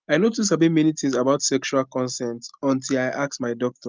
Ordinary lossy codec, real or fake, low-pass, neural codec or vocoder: Opus, 24 kbps; real; 7.2 kHz; none